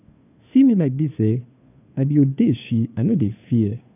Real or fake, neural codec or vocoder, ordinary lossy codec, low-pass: fake; codec, 16 kHz, 2 kbps, FunCodec, trained on Chinese and English, 25 frames a second; none; 3.6 kHz